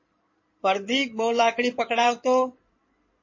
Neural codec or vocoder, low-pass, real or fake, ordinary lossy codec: codec, 16 kHz in and 24 kHz out, 2.2 kbps, FireRedTTS-2 codec; 7.2 kHz; fake; MP3, 32 kbps